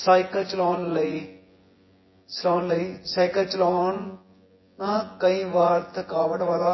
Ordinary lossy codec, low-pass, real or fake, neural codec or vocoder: MP3, 24 kbps; 7.2 kHz; fake; vocoder, 24 kHz, 100 mel bands, Vocos